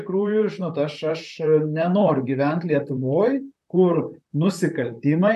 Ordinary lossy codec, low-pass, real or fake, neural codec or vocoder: MP3, 96 kbps; 14.4 kHz; fake; vocoder, 44.1 kHz, 128 mel bands, Pupu-Vocoder